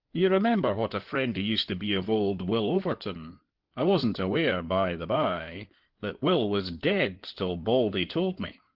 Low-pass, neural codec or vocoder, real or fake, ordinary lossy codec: 5.4 kHz; codec, 16 kHz in and 24 kHz out, 2.2 kbps, FireRedTTS-2 codec; fake; Opus, 16 kbps